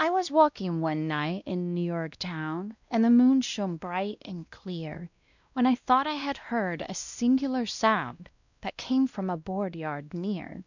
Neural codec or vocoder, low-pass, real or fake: codec, 16 kHz, 1 kbps, X-Codec, WavLM features, trained on Multilingual LibriSpeech; 7.2 kHz; fake